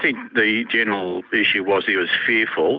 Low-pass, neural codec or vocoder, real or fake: 7.2 kHz; none; real